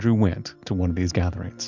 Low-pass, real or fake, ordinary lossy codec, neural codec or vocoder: 7.2 kHz; real; Opus, 64 kbps; none